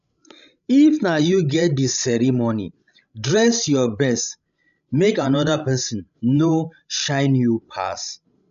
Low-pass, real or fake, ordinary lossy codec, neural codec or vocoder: 7.2 kHz; fake; none; codec, 16 kHz, 16 kbps, FreqCodec, larger model